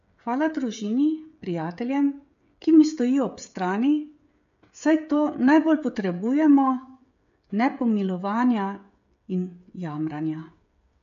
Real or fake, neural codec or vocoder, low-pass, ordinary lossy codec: fake; codec, 16 kHz, 16 kbps, FreqCodec, smaller model; 7.2 kHz; MP3, 48 kbps